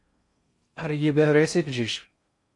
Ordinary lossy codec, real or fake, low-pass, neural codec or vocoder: MP3, 48 kbps; fake; 10.8 kHz; codec, 16 kHz in and 24 kHz out, 0.6 kbps, FocalCodec, streaming, 4096 codes